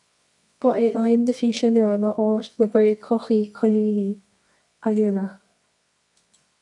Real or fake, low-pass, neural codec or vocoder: fake; 10.8 kHz; codec, 24 kHz, 0.9 kbps, WavTokenizer, medium music audio release